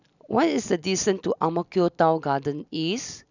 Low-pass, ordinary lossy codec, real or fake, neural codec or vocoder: 7.2 kHz; none; real; none